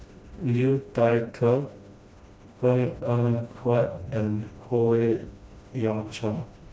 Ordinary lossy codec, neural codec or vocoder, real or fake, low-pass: none; codec, 16 kHz, 1 kbps, FreqCodec, smaller model; fake; none